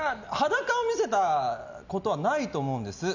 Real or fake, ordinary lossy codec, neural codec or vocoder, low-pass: real; none; none; 7.2 kHz